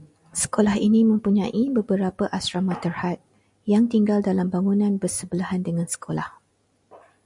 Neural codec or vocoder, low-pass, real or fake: none; 10.8 kHz; real